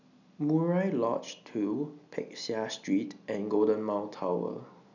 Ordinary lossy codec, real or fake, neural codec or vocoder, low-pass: none; real; none; 7.2 kHz